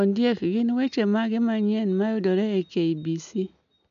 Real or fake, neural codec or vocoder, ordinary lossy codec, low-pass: real; none; none; 7.2 kHz